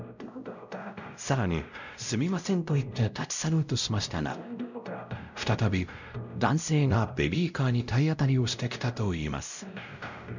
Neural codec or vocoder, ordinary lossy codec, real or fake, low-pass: codec, 16 kHz, 0.5 kbps, X-Codec, WavLM features, trained on Multilingual LibriSpeech; none; fake; 7.2 kHz